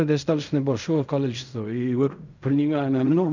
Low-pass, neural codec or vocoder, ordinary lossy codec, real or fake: 7.2 kHz; codec, 16 kHz in and 24 kHz out, 0.4 kbps, LongCat-Audio-Codec, fine tuned four codebook decoder; none; fake